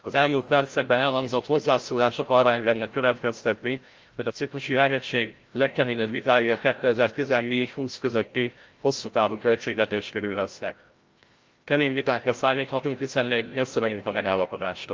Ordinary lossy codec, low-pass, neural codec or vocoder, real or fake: Opus, 32 kbps; 7.2 kHz; codec, 16 kHz, 0.5 kbps, FreqCodec, larger model; fake